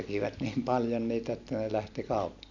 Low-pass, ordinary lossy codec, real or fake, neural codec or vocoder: 7.2 kHz; none; real; none